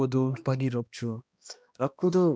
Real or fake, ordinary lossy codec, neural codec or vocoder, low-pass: fake; none; codec, 16 kHz, 1 kbps, X-Codec, HuBERT features, trained on balanced general audio; none